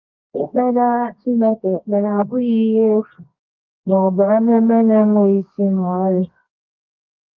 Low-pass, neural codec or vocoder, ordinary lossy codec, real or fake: 7.2 kHz; codec, 24 kHz, 0.9 kbps, WavTokenizer, medium music audio release; Opus, 32 kbps; fake